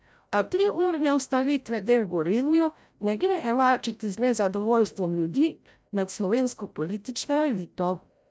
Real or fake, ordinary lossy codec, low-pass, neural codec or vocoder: fake; none; none; codec, 16 kHz, 0.5 kbps, FreqCodec, larger model